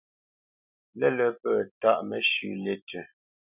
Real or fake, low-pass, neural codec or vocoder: real; 3.6 kHz; none